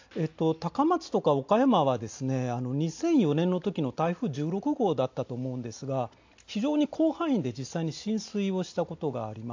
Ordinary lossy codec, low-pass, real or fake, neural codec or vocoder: AAC, 48 kbps; 7.2 kHz; real; none